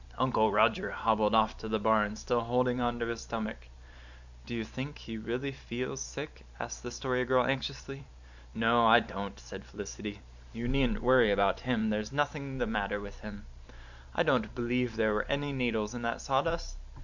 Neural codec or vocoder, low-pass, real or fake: vocoder, 44.1 kHz, 128 mel bands every 512 samples, BigVGAN v2; 7.2 kHz; fake